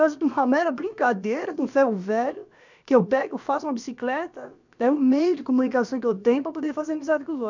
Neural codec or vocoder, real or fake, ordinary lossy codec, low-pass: codec, 16 kHz, about 1 kbps, DyCAST, with the encoder's durations; fake; none; 7.2 kHz